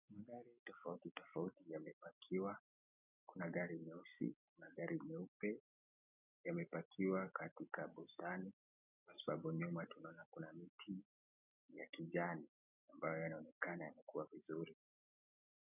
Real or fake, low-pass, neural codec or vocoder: real; 3.6 kHz; none